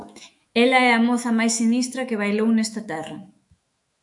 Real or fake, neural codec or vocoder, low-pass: fake; autoencoder, 48 kHz, 128 numbers a frame, DAC-VAE, trained on Japanese speech; 10.8 kHz